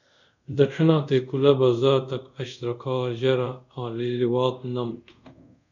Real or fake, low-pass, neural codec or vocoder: fake; 7.2 kHz; codec, 24 kHz, 0.5 kbps, DualCodec